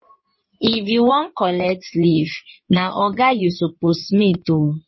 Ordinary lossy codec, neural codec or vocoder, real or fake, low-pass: MP3, 24 kbps; codec, 16 kHz in and 24 kHz out, 2.2 kbps, FireRedTTS-2 codec; fake; 7.2 kHz